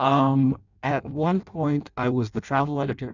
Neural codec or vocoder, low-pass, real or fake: codec, 16 kHz in and 24 kHz out, 0.6 kbps, FireRedTTS-2 codec; 7.2 kHz; fake